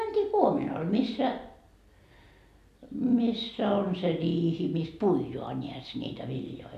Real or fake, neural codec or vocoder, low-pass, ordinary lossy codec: real; none; 14.4 kHz; none